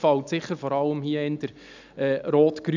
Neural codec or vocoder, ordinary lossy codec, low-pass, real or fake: none; none; 7.2 kHz; real